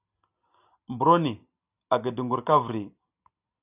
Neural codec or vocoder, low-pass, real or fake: none; 3.6 kHz; real